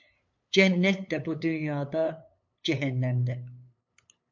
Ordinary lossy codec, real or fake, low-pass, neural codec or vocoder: MP3, 48 kbps; fake; 7.2 kHz; codec, 16 kHz, 8 kbps, FunCodec, trained on LibriTTS, 25 frames a second